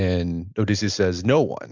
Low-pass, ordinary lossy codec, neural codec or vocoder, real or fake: 7.2 kHz; MP3, 64 kbps; none; real